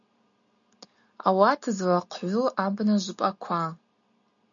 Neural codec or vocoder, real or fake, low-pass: none; real; 7.2 kHz